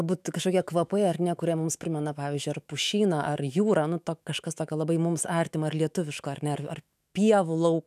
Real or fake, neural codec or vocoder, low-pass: fake; autoencoder, 48 kHz, 128 numbers a frame, DAC-VAE, trained on Japanese speech; 14.4 kHz